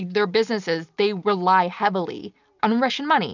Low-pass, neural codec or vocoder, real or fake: 7.2 kHz; none; real